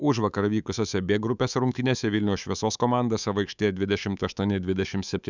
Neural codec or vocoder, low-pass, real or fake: codec, 24 kHz, 3.1 kbps, DualCodec; 7.2 kHz; fake